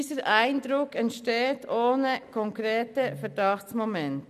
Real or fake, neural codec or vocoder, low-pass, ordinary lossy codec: real; none; 14.4 kHz; none